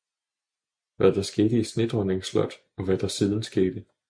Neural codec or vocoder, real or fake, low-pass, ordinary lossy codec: none; real; 9.9 kHz; MP3, 64 kbps